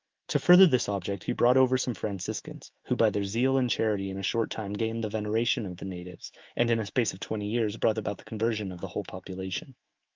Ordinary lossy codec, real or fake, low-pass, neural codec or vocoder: Opus, 24 kbps; fake; 7.2 kHz; autoencoder, 48 kHz, 128 numbers a frame, DAC-VAE, trained on Japanese speech